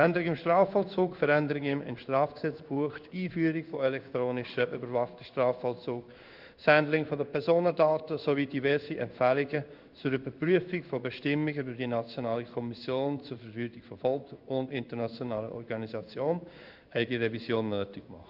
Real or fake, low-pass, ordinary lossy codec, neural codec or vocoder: fake; 5.4 kHz; none; codec, 16 kHz in and 24 kHz out, 1 kbps, XY-Tokenizer